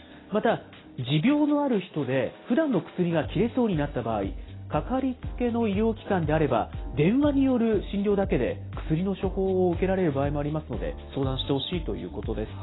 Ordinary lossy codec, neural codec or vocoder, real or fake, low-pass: AAC, 16 kbps; none; real; 7.2 kHz